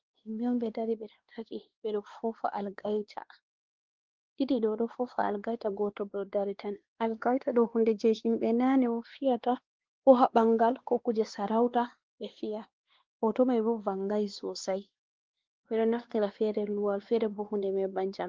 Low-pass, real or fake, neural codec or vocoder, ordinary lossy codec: 7.2 kHz; fake; codec, 16 kHz, 2 kbps, X-Codec, WavLM features, trained on Multilingual LibriSpeech; Opus, 16 kbps